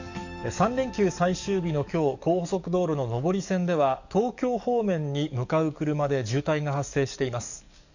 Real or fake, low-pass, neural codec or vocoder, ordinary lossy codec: fake; 7.2 kHz; codec, 44.1 kHz, 7.8 kbps, DAC; none